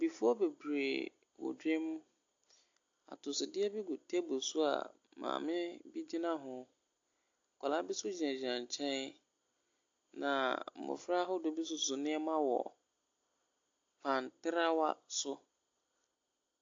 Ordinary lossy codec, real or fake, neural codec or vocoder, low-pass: AAC, 48 kbps; real; none; 7.2 kHz